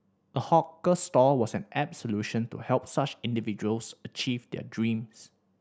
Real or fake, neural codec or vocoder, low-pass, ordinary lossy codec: real; none; none; none